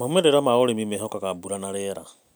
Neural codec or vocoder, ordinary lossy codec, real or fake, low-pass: none; none; real; none